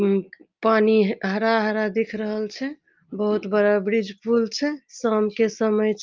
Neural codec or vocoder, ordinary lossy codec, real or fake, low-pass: none; Opus, 32 kbps; real; 7.2 kHz